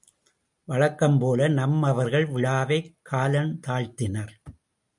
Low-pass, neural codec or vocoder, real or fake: 10.8 kHz; none; real